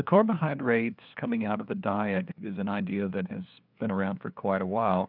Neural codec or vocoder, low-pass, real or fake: codec, 16 kHz, 2 kbps, FunCodec, trained on LibriTTS, 25 frames a second; 5.4 kHz; fake